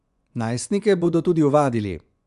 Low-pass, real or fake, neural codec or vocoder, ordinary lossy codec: 10.8 kHz; fake; vocoder, 24 kHz, 100 mel bands, Vocos; AAC, 96 kbps